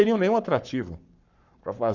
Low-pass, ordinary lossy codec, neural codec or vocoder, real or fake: 7.2 kHz; none; codec, 44.1 kHz, 7.8 kbps, Pupu-Codec; fake